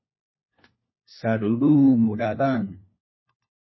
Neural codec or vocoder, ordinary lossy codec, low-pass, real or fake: codec, 16 kHz, 1 kbps, FunCodec, trained on LibriTTS, 50 frames a second; MP3, 24 kbps; 7.2 kHz; fake